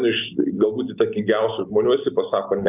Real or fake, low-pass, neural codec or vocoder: real; 3.6 kHz; none